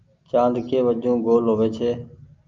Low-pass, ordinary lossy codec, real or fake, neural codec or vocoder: 7.2 kHz; Opus, 24 kbps; real; none